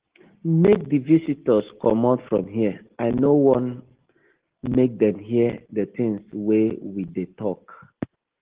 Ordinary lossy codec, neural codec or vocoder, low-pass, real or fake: Opus, 16 kbps; none; 3.6 kHz; real